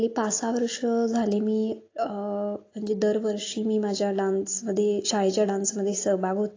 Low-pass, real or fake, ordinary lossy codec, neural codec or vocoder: 7.2 kHz; real; AAC, 32 kbps; none